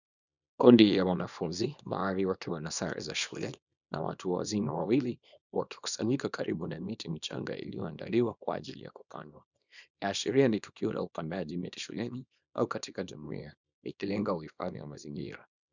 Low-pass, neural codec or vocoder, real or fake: 7.2 kHz; codec, 24 kHz, 0.9 kbps, WavTokenizer, small release; fake